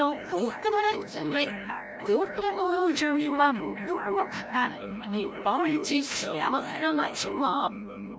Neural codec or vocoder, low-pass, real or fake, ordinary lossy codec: codec, 16 kHz, 0.5 kbps, FreqCodec, larger model; none; fake; none